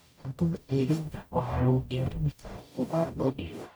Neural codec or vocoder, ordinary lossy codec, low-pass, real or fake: codec, 44.1 kHz, 0.9 kbps, DAC; none; none; fake